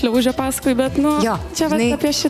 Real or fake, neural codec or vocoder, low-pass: real; none; 14.4 kHz